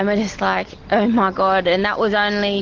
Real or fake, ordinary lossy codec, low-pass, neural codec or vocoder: real; Opus, 16 kbps; 7.2 kHz; none